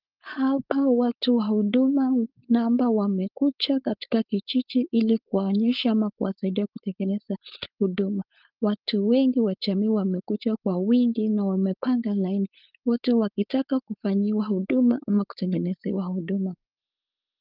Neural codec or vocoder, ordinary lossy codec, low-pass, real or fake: codec, 16 kHz, 4.8 kbps, FACodec; Opus, 32 kbps; 5.4 kHz; fake